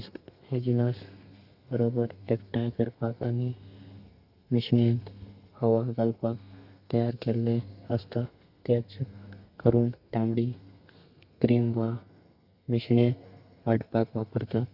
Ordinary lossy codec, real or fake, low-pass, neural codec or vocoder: Opus, 64 kbps; fake; 5.4 kHz; codec, 32 kHz, 1.9 kbps, SNAC